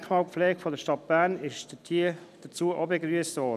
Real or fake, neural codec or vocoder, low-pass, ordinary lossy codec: real; none; 14.4 kHz; none